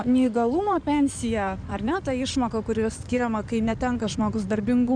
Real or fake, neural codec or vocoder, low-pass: fake; codec, 16 kHz in and 24 kHz out, 2.2 kbps, FireRedTTS-2 codec; 9.9 kHz